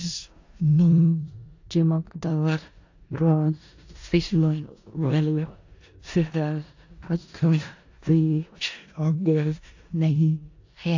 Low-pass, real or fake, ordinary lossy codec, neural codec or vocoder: 7.2 kHz; fake; AAC, 48 kbps; codec, 16 kHz in and 24 kHz out, 0.4 kbps, LongCat-Audio-Codec, four codebook decoder